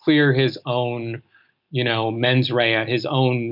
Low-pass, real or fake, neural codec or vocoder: 5.4 kHz; real; none